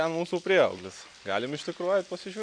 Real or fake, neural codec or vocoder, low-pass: real; none; 9.9 kHz